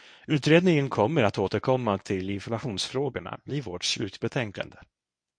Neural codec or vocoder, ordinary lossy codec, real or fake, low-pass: codec, 24 kHz, 0.9 kbps, WavTokenizer, medium speech release version 1; MP3, 48 kbps; fake; 9.9 kHz